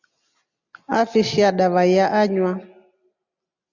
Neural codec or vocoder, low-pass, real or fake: none; 7.2 kHz; real